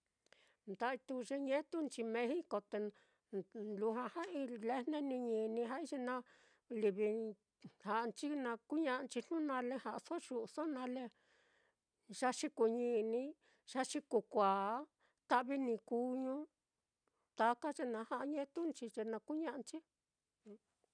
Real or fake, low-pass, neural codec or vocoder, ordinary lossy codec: real; 9.9 kHz; none; none